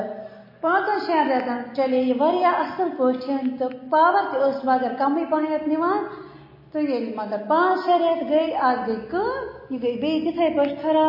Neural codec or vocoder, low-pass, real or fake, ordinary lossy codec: none; 5.4 kHz; real; MP3, 24 kbps